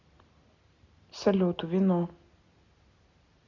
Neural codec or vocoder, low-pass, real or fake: none; 7.2 kHz; real